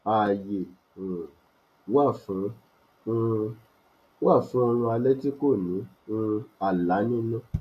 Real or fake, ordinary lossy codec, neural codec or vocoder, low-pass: real; none; none; 14.4 kHz